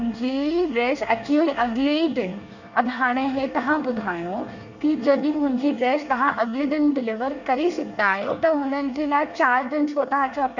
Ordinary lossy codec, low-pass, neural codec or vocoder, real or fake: none; 7.2 kHz; codec, 24 kHz, 1 kbps, SNAC; fake